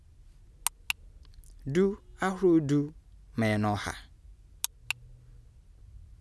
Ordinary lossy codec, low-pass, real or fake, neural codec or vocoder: none; none; real; none